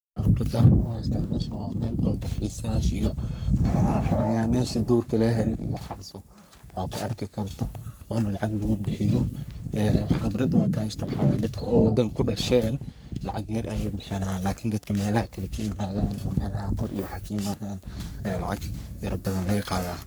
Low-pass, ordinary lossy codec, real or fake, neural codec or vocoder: none; none; fake; codec, 44.1 kHz, 3.4 kbps, Pupu-Codec